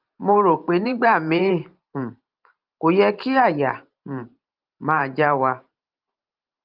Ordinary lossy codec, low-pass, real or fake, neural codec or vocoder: Opus, 32 kbps; 5.4 kHz; fake; vocoder, 44.1 kHz, 128 mel bands every 512 samples, BigVGAN v2